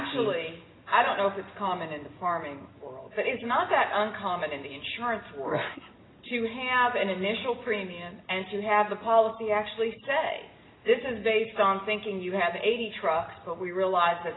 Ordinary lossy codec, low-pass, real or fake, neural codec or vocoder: AAC, 16 kbps; 7.2 kHz; real; none